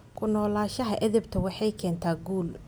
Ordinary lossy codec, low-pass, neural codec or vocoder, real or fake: none; none; none; real